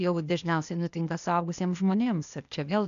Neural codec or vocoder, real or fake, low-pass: codec, 16 kHz, 0.8 kbps, ZipCodec; fake; 7.2 kHz